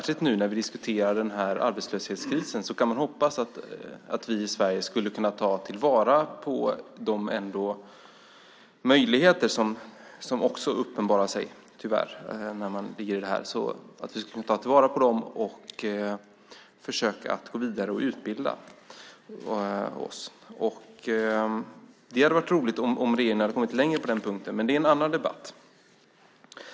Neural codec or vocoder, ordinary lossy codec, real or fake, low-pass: none; none; real; none